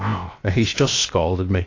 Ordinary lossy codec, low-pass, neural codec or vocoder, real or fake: AAC, 32 kbps; 7.2 kHz; codec, 16 kHz, 0.7 kbps, FocalCodec; fake